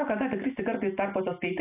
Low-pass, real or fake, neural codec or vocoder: 3.6 kHz; real; none